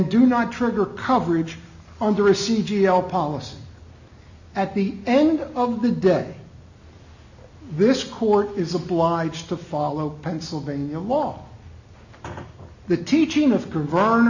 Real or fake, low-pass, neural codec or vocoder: real; 7.2 kHz; none